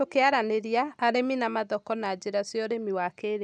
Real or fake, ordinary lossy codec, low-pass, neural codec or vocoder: real; none; 9.9 kHz; none